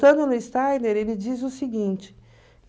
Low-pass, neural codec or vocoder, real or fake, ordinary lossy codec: none; none; real; none